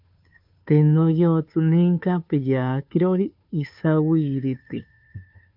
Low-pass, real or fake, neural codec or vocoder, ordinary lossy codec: 5.4 kHz; fake; codec, 16 kHz, 2 kbps, FunCodec, trained on Chinese and English, 25 frames a second; MP3, 48 kbps